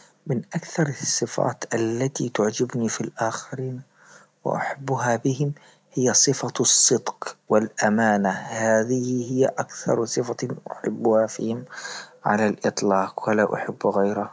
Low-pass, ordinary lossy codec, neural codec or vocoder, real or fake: none; none; none; real